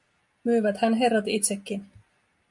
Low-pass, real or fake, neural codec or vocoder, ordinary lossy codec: 10.8 kHz; real; none; MP3, 64 kbps